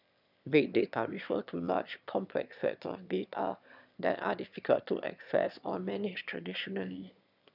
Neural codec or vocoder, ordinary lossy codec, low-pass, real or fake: autoencoder, 22.05 kHz, a latent of 192 numbers a frame, VITS, trained on one speaker; none; 5.4 kHz; fake